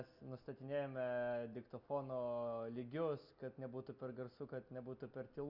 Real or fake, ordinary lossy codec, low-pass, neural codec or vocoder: real; Opus, 64 kbps; 5.4 kHz; none